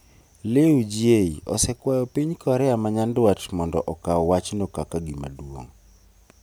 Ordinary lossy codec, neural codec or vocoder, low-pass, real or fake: none; vocoder, 44.1 kHz, 128 mel bands every 256 samples, BigVGAN v2; none; fake